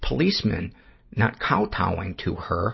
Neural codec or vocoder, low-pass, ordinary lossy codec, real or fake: none; 7.2 kHz; MP3, 24 kbps; real